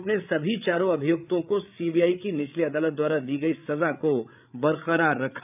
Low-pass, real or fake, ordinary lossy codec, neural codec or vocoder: 3.6 kHz; fake; none; codec, 16 kHz, 8 kbps, FreqCodec, larger model